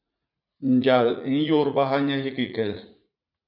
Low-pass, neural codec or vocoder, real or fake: 5.4 kHz; vocoder, 44.1 kHz, 80 mel bands, Vocos; fake